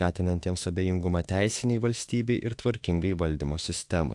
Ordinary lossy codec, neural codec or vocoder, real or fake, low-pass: AAC, 64 kbps; autoencoder, 48 kHz, 32 numbers a frame, DAC-VAE, trained on Japanese speech; fake; 10.8 kHz